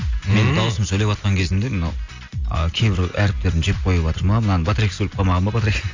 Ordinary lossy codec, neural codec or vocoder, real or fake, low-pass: AAC, 48 kbps; none; real; 7.2 kHz